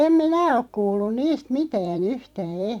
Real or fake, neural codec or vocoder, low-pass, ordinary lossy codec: fake; vocoder, 44.1 kHz, 128 mel bands every 512 samples, BigVGAN v2; 19.8 kHz; none